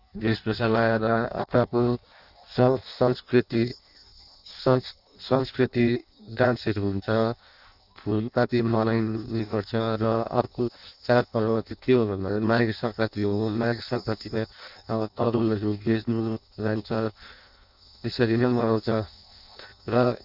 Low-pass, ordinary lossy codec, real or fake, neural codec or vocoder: 5.4 kHz; none; fake; codec, 16 kHz in and 24 kHz out, 0.6 kbps, FireRedTTS-2 codec